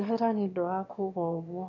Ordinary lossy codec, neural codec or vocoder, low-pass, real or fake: none; autoencoder, 22.05 kHz, a latent of 192 numbers a frame, VITS, trained on one speaker; 7.2 kHz; fake